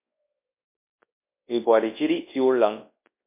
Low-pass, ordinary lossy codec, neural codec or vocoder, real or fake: 3.6 kHz; MP3, 24 kbps; codec, 24 kHz, 0.9 kbps, WavTokenizer, large speech release; fake